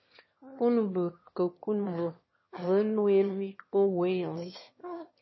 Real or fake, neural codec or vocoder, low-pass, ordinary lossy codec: fake; autoencoder, 22.05 kHz, a latent of 192 numbers a frame, VITS, trained on one speaker; 7.2 kHz; MP3, 24 kbps